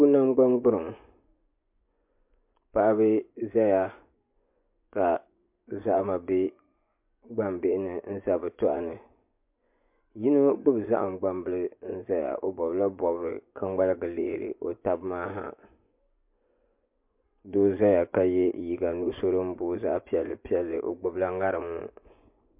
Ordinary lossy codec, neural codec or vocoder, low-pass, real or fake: MP3, 32 kbps; vocoder, 24 kHz, 100 mel bands, Vocos; 3.6 kHz; fake